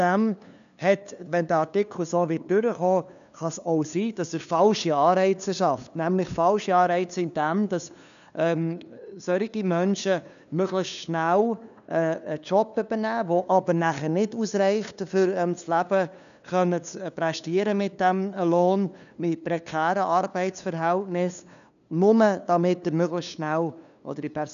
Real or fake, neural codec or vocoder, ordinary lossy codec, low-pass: fake; codec, 16 kHz, 2 kbps, FunCodec, trained on LibriTTS, 25 frames a second; none; 7.2 kHz